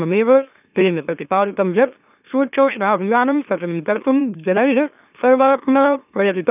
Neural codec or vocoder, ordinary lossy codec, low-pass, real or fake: autoencoder, 44.1 kHz, a latent of 192 numbers a frame, MeloTTS; none; 3.6 kHz; fake